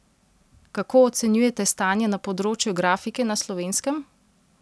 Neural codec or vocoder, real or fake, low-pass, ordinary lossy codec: none; real; none; none